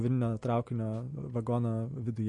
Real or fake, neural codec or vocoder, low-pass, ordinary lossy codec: real; none; 10.8 kHz; MP3, 48 kbps